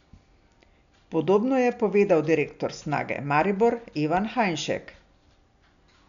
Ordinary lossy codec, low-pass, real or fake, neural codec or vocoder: none; 7.2 kHz; real; none